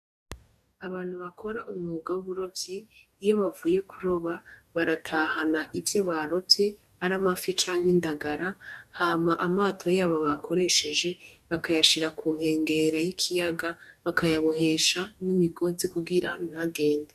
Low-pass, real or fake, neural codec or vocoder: 14.4 kHz; fake; codec, 44.1 kHz, 2.6 kbps, DAC